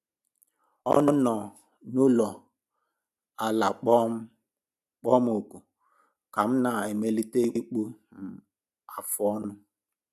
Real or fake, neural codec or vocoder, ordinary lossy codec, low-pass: real; none; none; 14.4 kHz